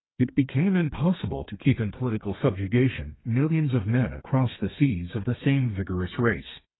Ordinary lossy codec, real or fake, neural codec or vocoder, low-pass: AAC, 16 kbps; fake; codec, 32 kHz, 1.9 kbps, SNAC; 7.2 kHz